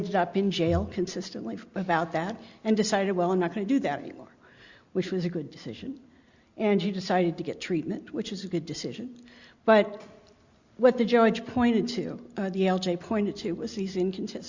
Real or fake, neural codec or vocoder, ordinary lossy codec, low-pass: real; none; Opus, 64 kbps; 7.2 kHz